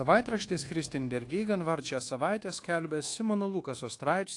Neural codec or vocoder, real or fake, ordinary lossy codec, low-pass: codec, 24 kHz, 1.2 kbps, DualCodec; fake; AAC, 48 kbps; 10.8 kHz